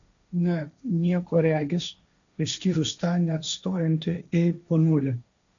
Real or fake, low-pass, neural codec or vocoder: fake; 7.2 kHz; codec, 16 kHz, 1.1 kbps, Voila-Tokenizer